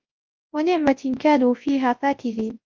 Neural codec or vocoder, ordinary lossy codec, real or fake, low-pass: codec, 24 kHz, 0.9 kbps, WavTokenizer, large speech release; Opus, 32 kbps; fake; 7.2 kHz